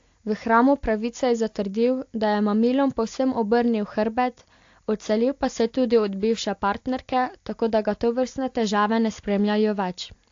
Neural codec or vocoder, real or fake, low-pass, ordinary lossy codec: none; real; 7.2 kHz; AAC, 48 kbps